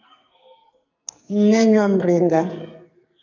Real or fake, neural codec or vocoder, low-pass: fake; codec, 44.1 kHz, 2.6 kbps, SNAC; 7.2 kHz